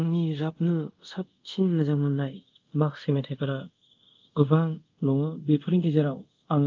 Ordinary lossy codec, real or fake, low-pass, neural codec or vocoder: Opus, 24 kbps; fake; 7.2 kHz; codec, 24 kHz, 0.5 kbps, DualCodec